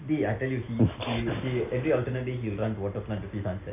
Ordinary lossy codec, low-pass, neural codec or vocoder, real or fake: AAC, 24 kbps; 3.6 kHz; none; real